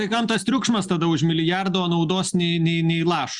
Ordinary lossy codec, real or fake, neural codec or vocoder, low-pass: Opus, 64 kbps; real; none; 10.8 kHz